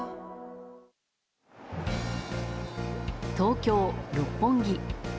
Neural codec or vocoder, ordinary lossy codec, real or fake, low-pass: none; none; real; none